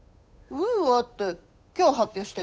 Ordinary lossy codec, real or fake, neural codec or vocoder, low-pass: none; fake; codec, 16 kHz, 8 kbps, FunCodec, trained on Chinese and English, 25 frames a second; none